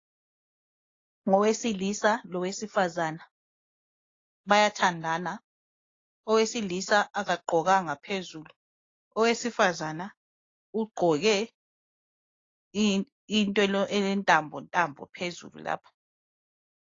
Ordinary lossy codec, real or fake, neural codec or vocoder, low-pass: AAC, 32 kbps; real; none; 7.2 kHz